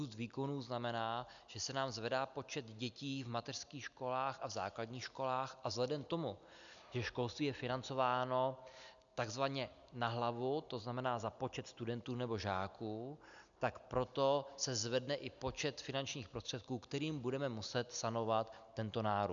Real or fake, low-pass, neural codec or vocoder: real; 7.2 kHz; none